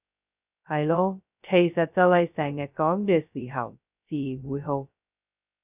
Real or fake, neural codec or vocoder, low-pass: fake; codec, 16 kHz, 0.2 kbps, FocalCodec; 3.6 kHz